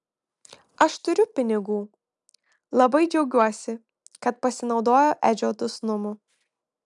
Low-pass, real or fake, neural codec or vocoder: 10.8 kHz; real; none